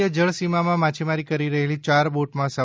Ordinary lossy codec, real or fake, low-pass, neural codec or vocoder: none; real; none; none